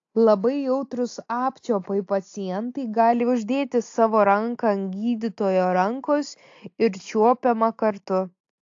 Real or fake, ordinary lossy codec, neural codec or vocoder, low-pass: real; AAC, 48 kbps; none; 7.2 kHz